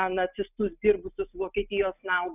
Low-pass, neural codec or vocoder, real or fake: 3.6 kHz; none; real